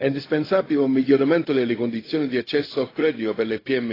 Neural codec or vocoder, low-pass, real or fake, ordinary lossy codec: codec, 16 kHz, 0.4 kbps, LongCat-Audio-Codec; 5.4 kHz; fake; AAC, 24 kbps